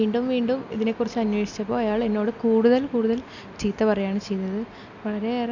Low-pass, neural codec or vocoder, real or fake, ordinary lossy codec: 7.2 kHz; none; real; none